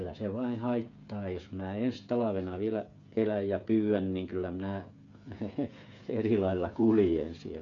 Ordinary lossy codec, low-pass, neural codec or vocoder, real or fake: MP3, 64 kbps; 7.2 kHz; codec, 16 kHz, 8 kbps, FreqCodec, smaller model; fake